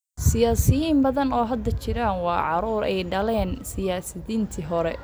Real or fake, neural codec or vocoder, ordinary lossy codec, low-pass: real; none; none; none